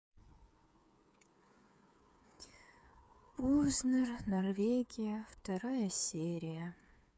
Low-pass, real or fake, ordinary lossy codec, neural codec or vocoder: none; fake; none; codec, 16 kHz, 8 kbps, FreqCodec, smaller model